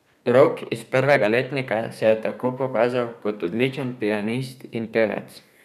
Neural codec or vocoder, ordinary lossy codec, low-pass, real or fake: codec, 32 kHz, 1.9 kbps, SNAC; none; 14.4 kHz; fake